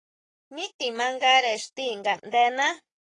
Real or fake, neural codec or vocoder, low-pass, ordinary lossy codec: fake; codec, 44.1 kHz, 7.8 kbps, Pupu-Codec; 10.8 kHz; AAC, 32 kbps